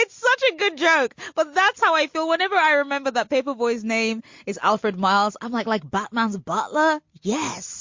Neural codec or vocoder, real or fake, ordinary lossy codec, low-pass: none; real; MP3, 48 kbps; 7.2 kHz